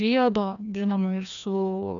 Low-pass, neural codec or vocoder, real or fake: 7.2 kHz; codec, 16 kHz, 1 kbps, FreqCodec, larger model; fake